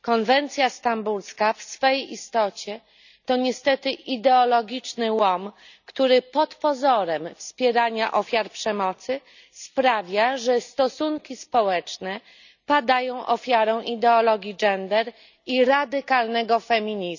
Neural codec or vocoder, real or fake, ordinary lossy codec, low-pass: none; real; none; 7.2 kHz